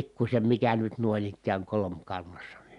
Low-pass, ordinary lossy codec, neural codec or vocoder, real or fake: 10.8 kHz; none; none; real